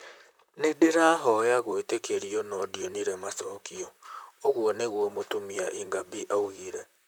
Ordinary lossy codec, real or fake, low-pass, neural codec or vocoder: none; fake; none; vocoder, 44.1 kHz, 128 mel bands, Pupu-Vocoder